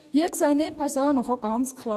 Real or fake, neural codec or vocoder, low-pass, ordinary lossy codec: fake; codec, 44.1 kHz, 2.6 kbps, DAC; 14.4 kHz; none